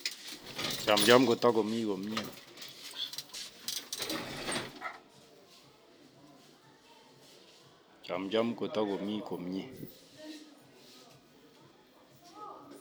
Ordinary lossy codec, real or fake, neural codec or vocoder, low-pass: none; real; none; none